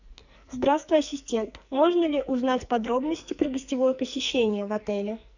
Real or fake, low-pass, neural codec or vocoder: fake; 7.2 kHz; codec, 44.1 kHz, 2.6 kbps, SNAC